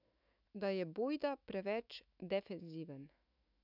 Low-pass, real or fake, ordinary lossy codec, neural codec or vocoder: 5.4 kHz; fake; none; autoencoder, 48 kHz, 128 numbers a frame, DAC-VAE, trained on Japanese speech